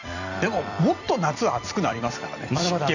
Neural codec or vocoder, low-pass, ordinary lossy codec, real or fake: vocoder, 44.1 kHz, 128 mel bands every 256 samples, BigVGAN v2; 7.2 kHz; none; fake